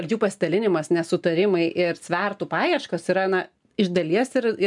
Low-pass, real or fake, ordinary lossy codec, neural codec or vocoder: 10.8 kHz; real; MP3, 96 kbps; none